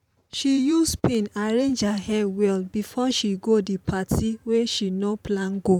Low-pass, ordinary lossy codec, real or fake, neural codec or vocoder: 19.8 kHz; none; fake; vocoder, 44.1 kHz, 128 mel bands every 512 samples, BigVGAN v2